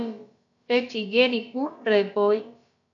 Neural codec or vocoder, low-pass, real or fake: codec, 16 kHz, about 1 kbps, DyCAST, with the encoder's durations; 7.2 kHz; fake